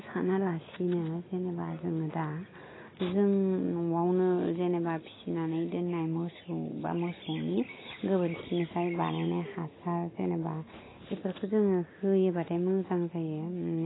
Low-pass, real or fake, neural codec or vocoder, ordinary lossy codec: 7.2 kHz; real; none; AAC, 16 kbps